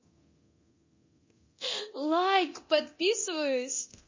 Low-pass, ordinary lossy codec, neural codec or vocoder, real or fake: 7.2 kHz; MP3, 32 kbps; codec, 24 kHz, 0.9 kbps, DualCodec; fake